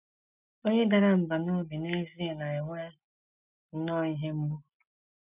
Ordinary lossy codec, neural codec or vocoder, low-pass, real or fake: none; none; 3.6 kHz; real